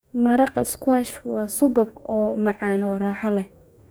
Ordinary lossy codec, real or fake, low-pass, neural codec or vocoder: none; fake; none; codec, 44.1 kHz, 2.6 kbps, DAC